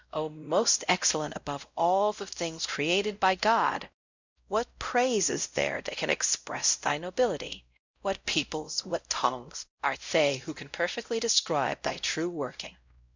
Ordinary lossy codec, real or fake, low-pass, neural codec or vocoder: Opus, 64 kbps; fake; 7.2 kHz; codec, 16 kHz, 0.5 kbps, X-Codec, WavLM features, trained on Multilingual LibriSpeech